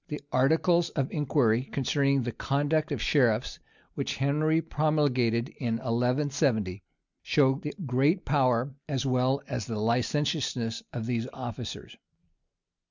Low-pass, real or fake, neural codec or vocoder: 7.2 kHz; real; none